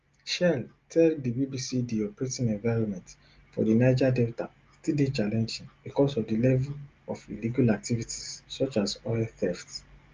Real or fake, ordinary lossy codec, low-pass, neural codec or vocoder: real; Opus, 32 kbps; 7.2 kHz; none